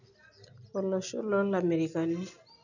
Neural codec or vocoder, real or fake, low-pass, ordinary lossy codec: none; real; 7.2 kHz; none